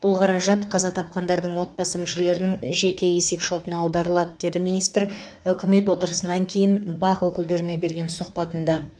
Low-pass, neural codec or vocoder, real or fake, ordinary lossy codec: 9.9 kHz; codec, 24 kHz, 1 kbps, SNAC; fake; none